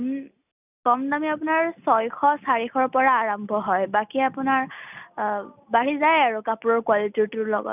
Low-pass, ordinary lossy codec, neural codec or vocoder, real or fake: 3.6 kHz; none; none; real